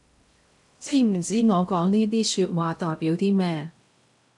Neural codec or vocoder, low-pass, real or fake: codec, 16 kHz in and 24 kHz out, 0.6 kbps, FocalCodec, streaming, 2048 codes; 10.8 kHz; fake